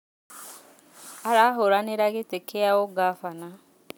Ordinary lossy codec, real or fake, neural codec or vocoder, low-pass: none; real; none; none